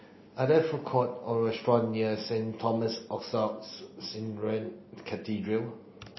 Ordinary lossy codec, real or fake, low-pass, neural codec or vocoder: MP3, 24 kbps; real; 7.2 kHz; none